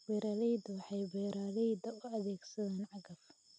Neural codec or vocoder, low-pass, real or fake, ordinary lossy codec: none; none; real; none